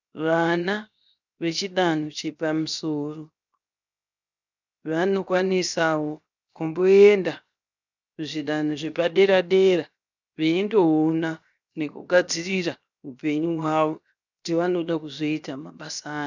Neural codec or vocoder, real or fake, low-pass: codec, 16 kHz, 0.7 kbps, FocalCodec; fake; 7.2 kHz